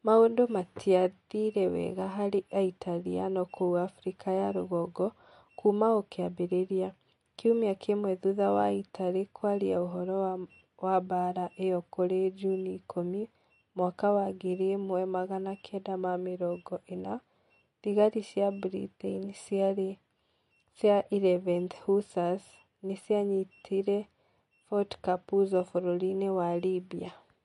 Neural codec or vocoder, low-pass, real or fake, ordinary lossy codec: none; 14.4 kHz; real; MP3, 48 kbps